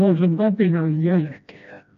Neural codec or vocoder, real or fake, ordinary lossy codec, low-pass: codec, 16 kHz, 1 kbps, FreqCodec, smaller model; fake; MP3, 96 kbps; 7.2 kHz